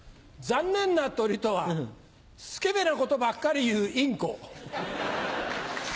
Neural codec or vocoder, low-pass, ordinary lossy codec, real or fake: none; none; none; real